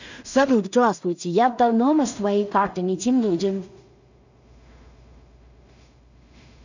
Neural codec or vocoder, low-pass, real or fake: codec, 16 kHz in and 24 kHz out, 0.4 kbps, LongCat-Audio-Codec, two codebook decoder; 7.2 kHz; fake